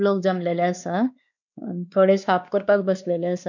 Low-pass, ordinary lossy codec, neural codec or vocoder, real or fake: 7.2 kHz; none; codec, 16 kHz, 2 kbps, X-Codec, WavLM features, trained on Multilingual LibriSpeech; fake